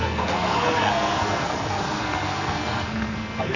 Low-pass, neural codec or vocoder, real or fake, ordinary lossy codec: 7.2 kHz; codec, 44.1 kHz, 2.6 kbps, SNAC; fake; none